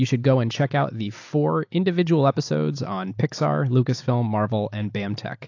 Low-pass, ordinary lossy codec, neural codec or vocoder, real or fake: 7.2 kHz; AAC, 48 kbps; none; real